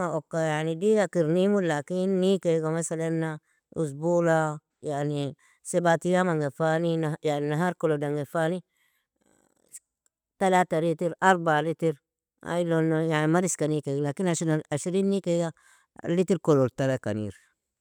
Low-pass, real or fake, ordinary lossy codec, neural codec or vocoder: 19.8 kHz; real; none; none